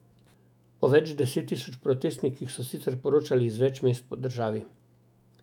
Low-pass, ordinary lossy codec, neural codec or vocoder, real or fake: 19.8 kHz; none; autoencoder, 48 kHz, 128 numbers a frame, DAC-VAE, trained on Japanese speech; fake